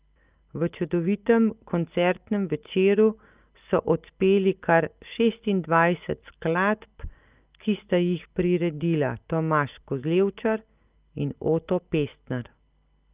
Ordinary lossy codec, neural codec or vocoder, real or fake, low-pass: Opus, 24 kbps; none; real; 3.6 kHz